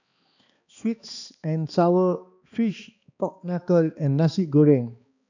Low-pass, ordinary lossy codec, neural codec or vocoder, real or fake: 7.2 kHz; none; codec, 16 kHz, 2 kbps, X-Codec, HuBERT features, trained on balanced general audio; fake